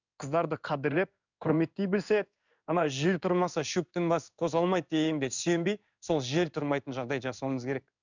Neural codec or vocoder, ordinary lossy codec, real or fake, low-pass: codec, 16 kHz in and 24 kHz out, 1 kbps, XY-Tokenizer; none; fake; 7.2 kHz